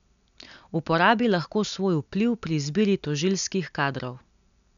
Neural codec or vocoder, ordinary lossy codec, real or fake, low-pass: none; none; real; 7.2 kHz